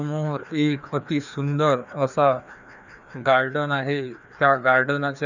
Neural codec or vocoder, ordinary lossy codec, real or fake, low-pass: codec, 16 kHz, 2 kbps, FreqCodec, larger model; none; fake; 7.2 kHz